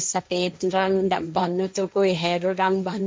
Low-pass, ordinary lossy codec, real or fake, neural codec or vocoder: none; none; fake; codec, 16 kHz, 1.1 kbps, Voila-Tokenizer